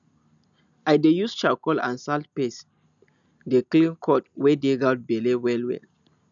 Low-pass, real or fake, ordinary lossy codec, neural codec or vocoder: 7.2 kHz; real; none; none